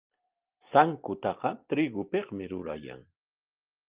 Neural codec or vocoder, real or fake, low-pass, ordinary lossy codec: none; real; 3.6 kHz; Opus, 32 kbps